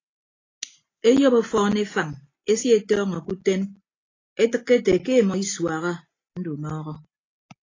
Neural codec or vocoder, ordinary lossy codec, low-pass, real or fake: none; AAC, 32 kbps; 7.2 kHz; real